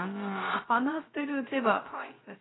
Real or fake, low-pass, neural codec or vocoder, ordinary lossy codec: fake; 7.2 kHz; codec, 16 kHz, 0.3 kbps, FocalCodec; AAC, 16 kbps